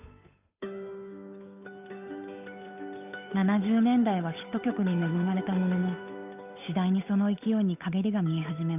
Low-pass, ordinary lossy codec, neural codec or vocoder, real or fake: 3.6 kHz; none; codec, 16 kHz, 8 kbps, FunCodec, trained on Chinese and English, 25 frames a second; fake